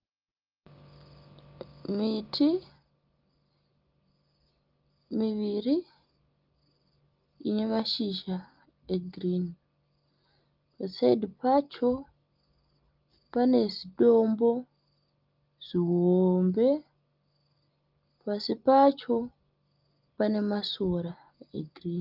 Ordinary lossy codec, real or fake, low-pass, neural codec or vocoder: Opus, 24 kbps; real; 5.4 kHz; none